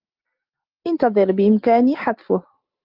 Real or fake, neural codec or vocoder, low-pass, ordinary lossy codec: real; none; 5.4 kHz; Opus, 24 kbps